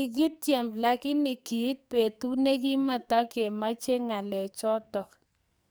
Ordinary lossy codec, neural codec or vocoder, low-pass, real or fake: none; codec, 44.1 kHz, 2.6 kbps, SNAC; none; fake